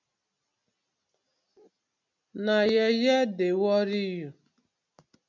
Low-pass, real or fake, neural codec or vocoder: 7.2 kHz; real; none